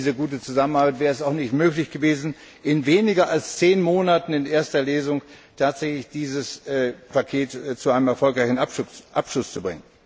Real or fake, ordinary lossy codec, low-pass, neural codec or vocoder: real; none; none; none